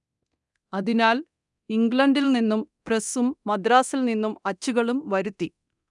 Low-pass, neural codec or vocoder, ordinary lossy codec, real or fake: 10.8 kHz; codec, 24 kHz, 0.9 kbps, DualCodec; none; fake